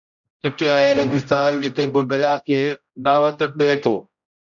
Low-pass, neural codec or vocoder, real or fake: 7.2 kHz; codec, 16 kHz, 0.5 kbps, X-Codec, HuBERT features, trained on general audio; fake